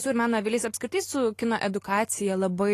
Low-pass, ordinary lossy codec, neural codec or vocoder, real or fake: 14.4 kHz; AAC, 48 kbps; none; real